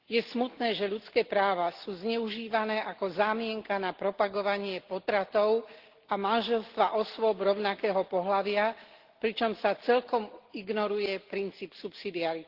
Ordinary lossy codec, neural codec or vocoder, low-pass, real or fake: Opus, 16 kbps; none; 5.4 kHz; real